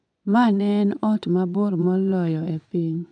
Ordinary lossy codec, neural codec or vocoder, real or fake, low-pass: none; vocoder, 24 kHz, 100 mel bands, Vocos; fake; 9.9 kHz